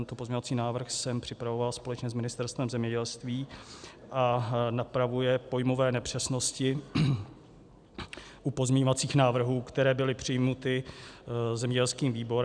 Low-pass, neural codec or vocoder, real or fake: 9.9 kHz; none; real